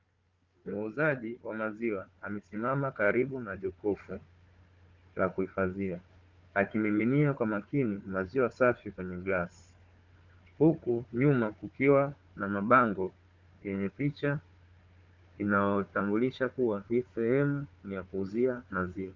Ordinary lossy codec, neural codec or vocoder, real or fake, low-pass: Opus, 24 kbps; codec, 16 kHz, 4 kbps, FunCodec, trained on Chinese and English, 50 frames a second; fake; 7.2 kHz